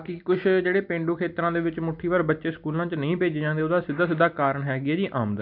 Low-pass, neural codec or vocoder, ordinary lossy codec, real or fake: 5.4 kHz; none; none; real